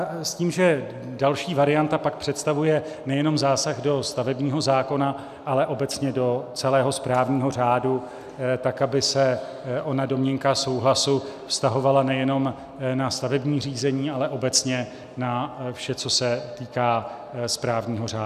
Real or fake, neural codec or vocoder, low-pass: real; none; 14.4 kHz